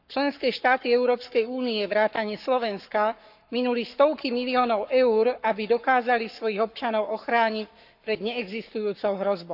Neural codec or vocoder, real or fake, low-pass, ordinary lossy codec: codec, 44.1 kHz, 7.8 kbps, Pupu-Codec; fake; 5.4 kHz; AAC, 48 kbps